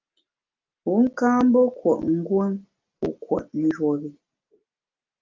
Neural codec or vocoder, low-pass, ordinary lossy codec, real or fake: none; 7.2 kHz; Opus, 24 kbps; real